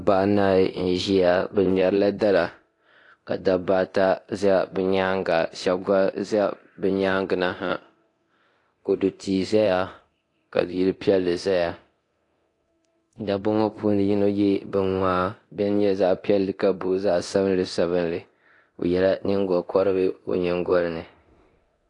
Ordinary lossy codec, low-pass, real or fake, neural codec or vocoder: AAC, 48 kbps; 10.8 kHz; fake; codec, 24 kHz, 0.9 kbps, DualCodec